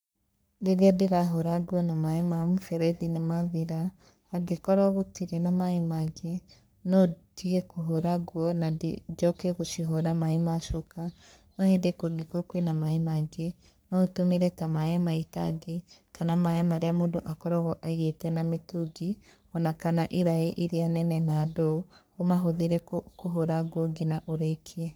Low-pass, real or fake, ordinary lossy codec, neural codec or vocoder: none; fake; none; codec, 44.1 kHz, 3.4 kbps, Pupu-Codec